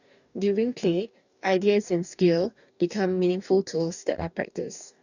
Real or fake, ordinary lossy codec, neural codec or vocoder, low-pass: fake; none; codec, 44.1 kHz, 2.6 kbps, DAC; 7.2 kHz